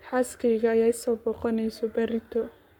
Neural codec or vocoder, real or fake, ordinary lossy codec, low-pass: codec, 44.1 kHz, 7.8 kbps, Pupu-Codec; fake; none; 19.8 kHz